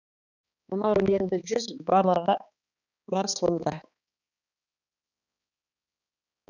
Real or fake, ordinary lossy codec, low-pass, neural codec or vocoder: fake; none; 7.2 kHz; codec, 16 kHz, 2 kbps, X-Codec, HuBERT features, trained on balanced general audio